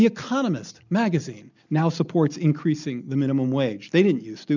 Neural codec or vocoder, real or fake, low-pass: none; real; 7.2 kHz